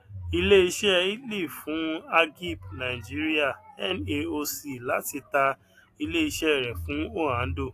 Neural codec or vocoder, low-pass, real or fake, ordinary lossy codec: none; 14.4 kHz; real; AAC, 64 kbps